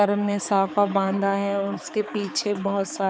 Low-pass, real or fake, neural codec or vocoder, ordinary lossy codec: none; fake; codec, 16 kHz, 4 kbps, X-Codec, HuBERT features, trained on balanced general audio; none